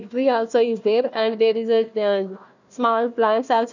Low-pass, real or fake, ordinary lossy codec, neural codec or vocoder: 7.2 kHz; fake; none; codec, 16 kHz, 1 kbps, FunCodec, trained on Chinese and English, 50 frames a second